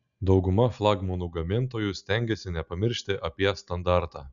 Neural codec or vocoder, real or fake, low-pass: none; real; 7.2 kHz